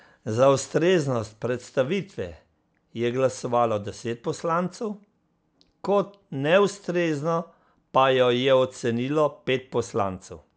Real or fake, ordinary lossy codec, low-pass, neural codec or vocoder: real; none; none; none